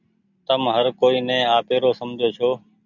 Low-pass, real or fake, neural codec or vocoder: 7.2 kHz; real; none